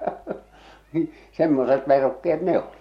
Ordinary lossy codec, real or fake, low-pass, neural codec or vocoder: MP3, 64 kbps; real; 19.8 kHz; none